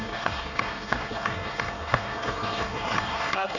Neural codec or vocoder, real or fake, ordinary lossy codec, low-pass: codec, 24 kHz, 1 kbps, SNAC; fake; none; 7.2 kHz